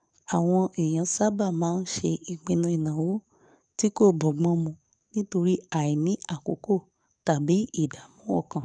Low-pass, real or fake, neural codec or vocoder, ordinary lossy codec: 9.9 kHz; fake; codec, 44.1 kHz, 7.8 kbps, DAC; none